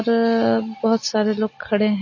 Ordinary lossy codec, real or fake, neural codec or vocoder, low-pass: MP3, 32 kbps; real; none; 7.2 kHz